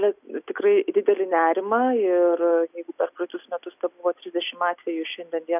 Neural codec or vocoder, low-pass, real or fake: none; 3.6 kHz; real